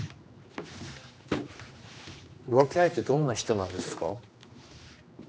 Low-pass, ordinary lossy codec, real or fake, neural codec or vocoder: none; none; fake; codec, 16 kHz, 2 kbps, X-Codec, HuBERT features, trained on general audio